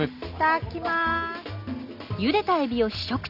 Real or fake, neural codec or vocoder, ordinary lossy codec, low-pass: real; none; none; 5.4 kHz